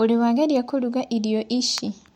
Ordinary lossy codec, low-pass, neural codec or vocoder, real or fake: MP3, 64 kbps; 19.8 kHz; none; real